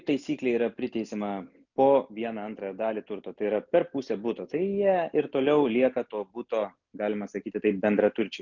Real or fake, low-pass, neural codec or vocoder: real; 7.2 kHz; none